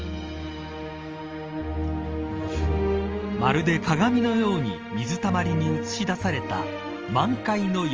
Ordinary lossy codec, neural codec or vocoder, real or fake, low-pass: Opus, 24 kbps; none; real; 7.2 kHz